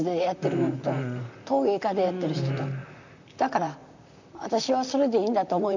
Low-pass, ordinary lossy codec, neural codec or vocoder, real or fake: 7.2 kHz; none; vocoder, 44.1 kHz, 128 mel bands, Pupu-Vocoder; fake